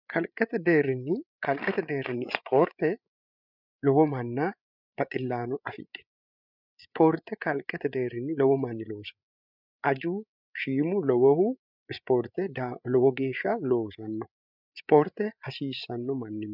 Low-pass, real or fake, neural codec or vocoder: 5.4 kHz; fake; codec, 16 kHz, 8 kbps, FreqCodec, larger model